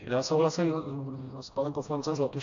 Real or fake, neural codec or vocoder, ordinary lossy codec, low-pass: fake; codec, 16 kHz, 1 kbps, FreqCodec, smaller model; AAC, 64 kbps; 7.2 kHz